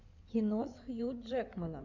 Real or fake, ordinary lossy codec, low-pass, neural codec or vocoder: fake; MP3, 64 kbps; 7.2 kHz; codec, 16 kHz in and 24 kHz out, 2.2 kbps, FireRedTTS-2 codec